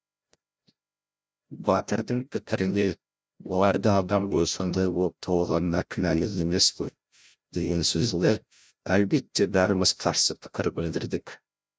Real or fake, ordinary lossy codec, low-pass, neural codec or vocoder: fake; none; none; codec, 16 kHz, 0.5 kbps, FreqCodec, larger model